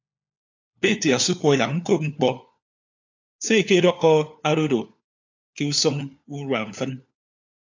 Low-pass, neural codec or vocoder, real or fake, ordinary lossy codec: 7.2 kHz; codec, 16 kHz, 4 kbps, FunCodec, trained on LibriTTS, 50 frames a second; fake; AAC, 48 kbps